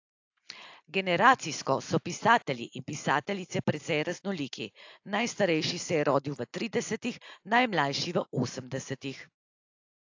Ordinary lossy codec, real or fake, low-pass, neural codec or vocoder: AAC, 48 kbps; real; 7.2 kHz; none